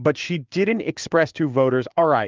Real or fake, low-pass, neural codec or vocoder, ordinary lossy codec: fake; 7.2 kHz; codec, 16 kHz in and 24 kHz out, 1 kbps, XY-Tokenizer; Opus, 32 kbps